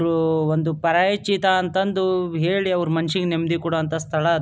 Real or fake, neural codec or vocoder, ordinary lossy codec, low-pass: real; none; none; none